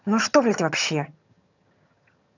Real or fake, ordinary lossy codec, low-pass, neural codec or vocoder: fake; none; 7.2 kHz; vocoder, 22.05 kHz, 80 mel bands, HiFi-GAN